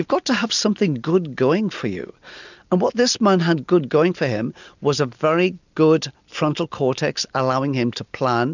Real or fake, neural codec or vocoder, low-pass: real; none; 7.2 kHz